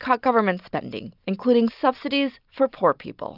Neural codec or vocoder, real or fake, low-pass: none; real; 5.4 kHz